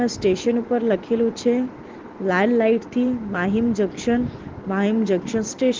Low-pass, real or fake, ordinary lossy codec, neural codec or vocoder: 7.2 kHz; real; Opus, 16 kbps; none